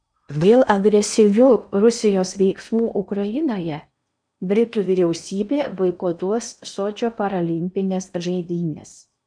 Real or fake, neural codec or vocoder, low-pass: fake; codec, 16 kHz in and 24 kHz out, 0.8 kbps, FocalCodec, streaming, 65536 codes; 9.9 kHz